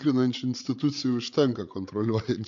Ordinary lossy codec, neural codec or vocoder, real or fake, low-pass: MP3, 48 kbps; codec, 16 kHz, 8 kbps, FreqCodec, larger model; fake; 7.2 kHz